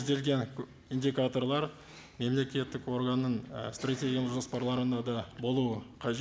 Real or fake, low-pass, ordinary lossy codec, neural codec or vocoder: real; none; none; none